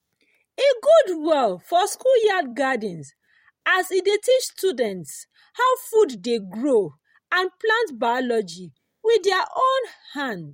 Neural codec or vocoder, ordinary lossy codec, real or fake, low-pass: vocoder, 44.1 kHz, 128 mel bands every 256 samples, BigVGAN v2; MP3, 64 kbps; fake; 19.8 kHz